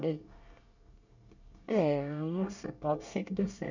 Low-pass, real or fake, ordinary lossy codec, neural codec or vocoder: 7.2 kHz; fake; none; codec, 24 kHz, 1 kbps, SNAC